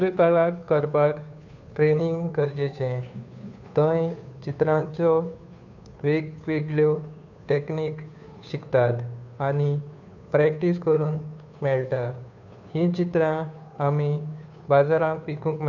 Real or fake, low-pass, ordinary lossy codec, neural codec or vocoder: fake; 7.2 kHz; none; codec, 16 kHz, 2 kbps, FunCodec, trained on Chinese and English, 25 frames a second